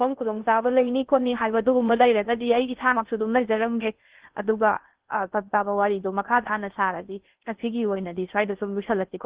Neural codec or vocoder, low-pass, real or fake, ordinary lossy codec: codec, 16 kHz in and 24 kHz out, 0.6 kbps, FocalCodec, streaming, 2048 codes; 3.6 kHz; fake; Opus, 16 kbps